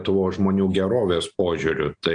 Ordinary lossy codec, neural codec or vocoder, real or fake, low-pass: AAC, 64 kbps; none; real; 9.9 kHz